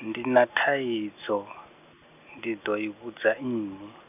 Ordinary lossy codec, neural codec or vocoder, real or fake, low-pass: none; none; real; 3.6 kHz